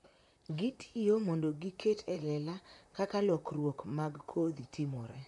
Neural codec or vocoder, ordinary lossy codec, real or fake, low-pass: vocoder, 44.1 kHz, 128 mel bands, Pupu-Vocoder; none; fake; 10.8 kHz